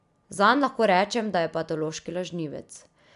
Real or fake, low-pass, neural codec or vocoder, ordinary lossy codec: real; 10.8 kHz; none; none